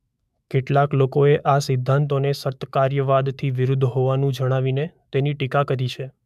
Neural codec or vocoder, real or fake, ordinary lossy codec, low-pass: autoencoder, 48 kHz, 128 numbers a frame, DAC-VAE, trained on Japanese speech; fake; MP3, 96 kbps; 14.4 kHz